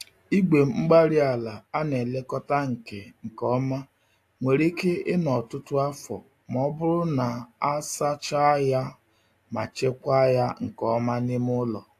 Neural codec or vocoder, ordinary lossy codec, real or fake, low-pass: none; AAC, 64 kbps; real; 14.4 kHz